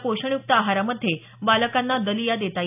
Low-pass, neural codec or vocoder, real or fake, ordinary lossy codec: 3.6 kHz; none; real; none